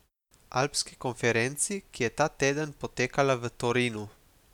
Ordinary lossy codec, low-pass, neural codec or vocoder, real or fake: none; 19.8 kHz; none; real